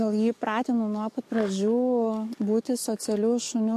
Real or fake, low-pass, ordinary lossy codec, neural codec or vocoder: fake; 14.4 kHz; MP3, 64 kbps; codec, 44.1 kHz, 7.8 kbps, DAC